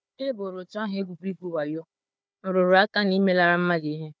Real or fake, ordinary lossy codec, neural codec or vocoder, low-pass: fake; none; codec, 16 kHz, 4 kbps, FunCodec, trained on Chinese and English, 50 frames a second; none